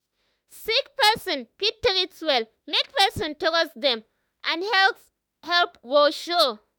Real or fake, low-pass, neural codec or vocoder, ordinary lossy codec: fake; none; autoencoder, 48 kHz, 32 numbers a frame, DAC-VAE, trained on Japanese speech; none